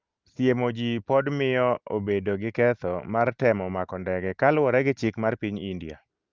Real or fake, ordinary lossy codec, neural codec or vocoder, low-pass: real; Opus, 32 kbps; none; 7.2 kHz